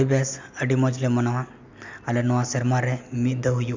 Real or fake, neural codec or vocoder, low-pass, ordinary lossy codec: real; none; 7.2 kHz; MP3, 64 kbps